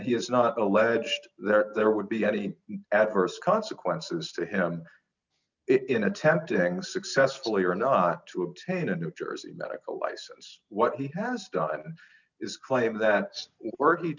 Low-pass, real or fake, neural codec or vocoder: 7.2 kHz; real; none